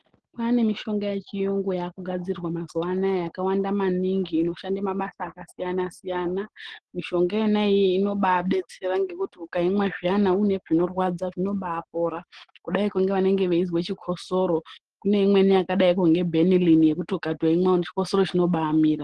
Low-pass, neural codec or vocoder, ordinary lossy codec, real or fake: 10.8 kHz; none; Opus, 16 kbps; real